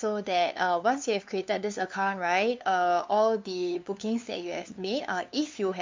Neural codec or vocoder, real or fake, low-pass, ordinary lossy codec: codec, 16 kHz, 2 kbps, FunCodec, trained on LibriTTS, 25 frames a second; fake; 7.2 kHz; MP3, 64 kbps